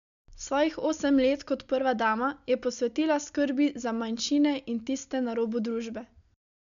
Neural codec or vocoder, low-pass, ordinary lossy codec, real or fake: none; 7.2 kHz; none; real